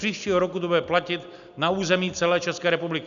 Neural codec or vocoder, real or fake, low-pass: none; real; 7.2 kHz